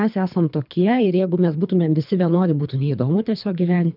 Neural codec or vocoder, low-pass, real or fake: codec, 24 kHz, 3 kbps, HILCodec; 5.4 kHz; fake